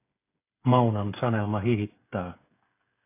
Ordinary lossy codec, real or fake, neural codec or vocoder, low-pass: AAC, 24 kbps; fake; codec, 16 kHz, 8 kbps, FreqCodec, smaller model; 3.6 kHz